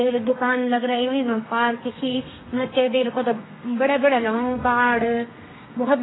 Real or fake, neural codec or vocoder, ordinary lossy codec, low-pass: fake; codec, 32 kHz, 1.9 kbps, SNAC; AAC, 16 kbps; 7.2 kHz